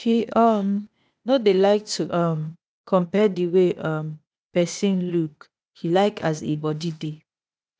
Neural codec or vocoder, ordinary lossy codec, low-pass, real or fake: codec, 16 kHz, 0.8 kbps, ZipCodec; none; none; fake